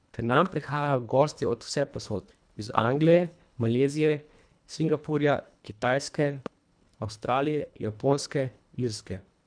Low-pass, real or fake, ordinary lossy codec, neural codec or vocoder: 9.9 kHz; fake; none; codec, 24 kHz, 1.5 kbps, HILCodec